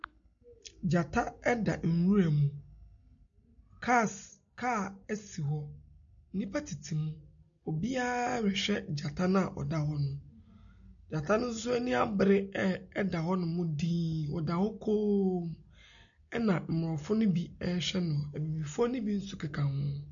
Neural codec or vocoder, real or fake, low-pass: none; real; 7.2 kHz